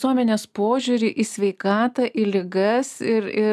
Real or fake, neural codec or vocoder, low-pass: real; none; 14.4 kHz